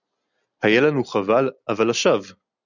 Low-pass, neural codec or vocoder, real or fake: 7.2 kHz; none; real